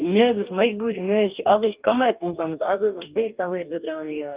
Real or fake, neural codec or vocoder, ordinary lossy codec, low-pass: fake; codec, 44.1 kHz, 2.6 kbps, DAC; Opus, 24 kbps; 3.6 kHz